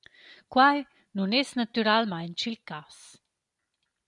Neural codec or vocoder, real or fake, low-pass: none; real; 10.8 kHz